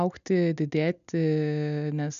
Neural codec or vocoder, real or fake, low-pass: none; real; 7.2 kHz